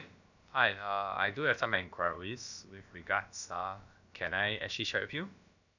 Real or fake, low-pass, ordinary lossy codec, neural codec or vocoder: fake; 7.2 kHz; none; codec, 16 kHz, about 1 kbps, DyCAST, with the encoder's durations